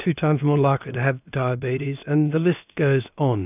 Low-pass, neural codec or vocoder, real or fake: 3.6 kHz; codec, 16 kHz, about 1 kbps, DyCAST, with the encoder's durations; fake